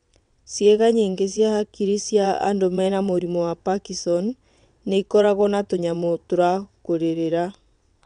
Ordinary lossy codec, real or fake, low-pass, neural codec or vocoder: none; fake; 9.9 kHz; vocoder, 22.05 kHz, 80 mel bands, WaveNeXt